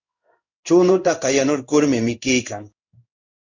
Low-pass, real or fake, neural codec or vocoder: 7.2 kHz; fake; codec, 16 kHz in and 24 kHz out, 1 kbps, XY-Tokenizer